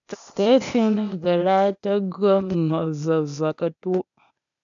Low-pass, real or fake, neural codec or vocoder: 7.2 kHz; fake; codec, 16 kHz, 0.8 kbps, ZipCodec